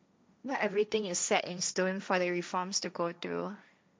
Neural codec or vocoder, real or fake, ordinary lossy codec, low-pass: codec, 16 kHz, 1.1 kbps, Voila-Tokenizer; fake; none; none